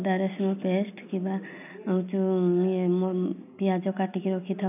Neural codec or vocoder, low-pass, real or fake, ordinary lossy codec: none; 3.6 kHz; real; none